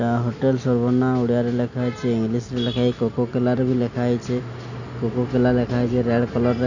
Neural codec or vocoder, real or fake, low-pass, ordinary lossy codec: none; real; 7.2 kHz; none